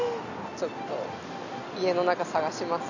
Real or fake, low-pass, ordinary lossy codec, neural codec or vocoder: real; 7.2 kHz; none; none